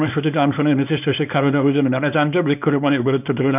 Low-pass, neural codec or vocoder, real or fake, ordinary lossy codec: 3.6 kHz; codec, 24 kHz, 0.9 kbps, WavTokenizer, small release; fake; none